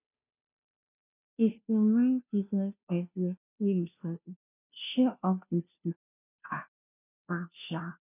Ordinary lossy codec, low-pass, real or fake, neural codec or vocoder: none; 3.6 kHz; fake; codec, 16 kHz, 0.5 kbps, FunCodec, trained on Chinese and English, 25 frames a second